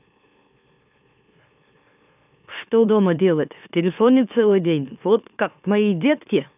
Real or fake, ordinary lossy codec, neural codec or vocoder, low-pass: fake; none; autoencoder, 44.1 kHz, a latent of 192 numbers a frame, MeloTTS; 3.6 kHz